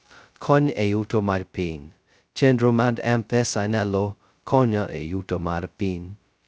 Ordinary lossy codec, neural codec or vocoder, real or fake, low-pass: none; codec, 16 kHz, 0.2 kbps, FocalCodec; fake; none